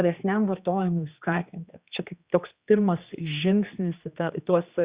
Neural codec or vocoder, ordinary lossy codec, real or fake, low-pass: codec, 16 kHz, 2 kbps, X-Codec, HuBERT features, trained on general audio; Opus, 64 kbps; fake; 3.6 kHz